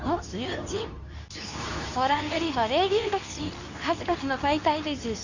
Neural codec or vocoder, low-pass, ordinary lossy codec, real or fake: codec, 24 kHz, 0.9 kbps, WavTokenizer, medium speech release version 2; 7.2 kHz; none; fake